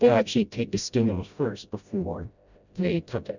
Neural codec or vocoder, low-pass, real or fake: codec, 16 kHz, 0.5 kbps, FreqCodec, smaller model; 7.2 kHz; fake